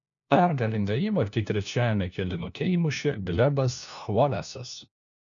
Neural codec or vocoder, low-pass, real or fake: codec, 16 kHz, 1 kbps, FunCodec, trained on LibriTTS, 50 frames a second; 7.2 kHz; fake